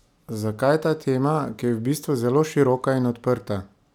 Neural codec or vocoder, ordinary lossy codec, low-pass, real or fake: none; none; 19.8 kHz; real